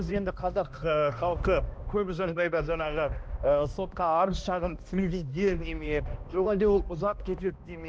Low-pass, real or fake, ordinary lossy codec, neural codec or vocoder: none; fake; none; codec, 16 kHz, 1 kbps, X-Codec, HuBERT features, trained on balanced general audio